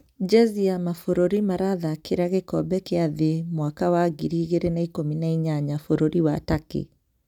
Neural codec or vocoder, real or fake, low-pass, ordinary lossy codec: none; real; 19.8 kHz; none